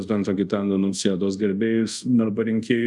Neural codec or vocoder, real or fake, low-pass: codec, 24 kHz, 0.5 kbps, DualCodec; fake; 10.8 kHz